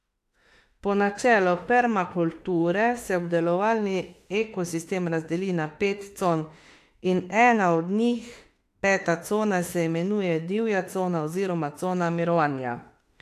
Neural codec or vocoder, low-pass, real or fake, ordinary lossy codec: autoencoder, 48 kHz, 32 numbers a frame, DAC-VAE, trained on Japanese speech; 14.4 kHz; fake; AAC, 64 kbps